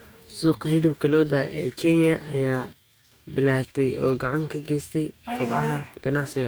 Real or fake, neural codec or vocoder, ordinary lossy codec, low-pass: fake; codec, 44.1 kHz, 2.6 kbps, DAC; none; none